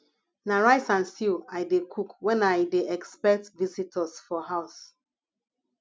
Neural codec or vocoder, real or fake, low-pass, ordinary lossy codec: none; real; none; none